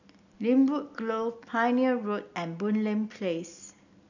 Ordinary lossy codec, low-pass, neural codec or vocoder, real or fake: none; 7.2 kHz; none; real